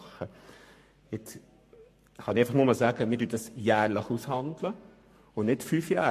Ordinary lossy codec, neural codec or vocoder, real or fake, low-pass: MP3, 64 kbps; codec, 44.1 kHz, 7.8 kbps, Pupu-Codec; fake; 14.4 kHz